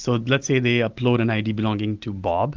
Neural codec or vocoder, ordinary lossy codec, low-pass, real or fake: none; Opus, 32 kbps; 7.2 kHz; real